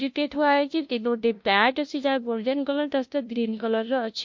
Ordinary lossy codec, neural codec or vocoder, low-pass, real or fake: MP3, 48 kbps; codec, 16 kHz, 0.5 kbps, FunCodec, trained on LibriTTS, 25 frames a second; 7.2 kHz; fake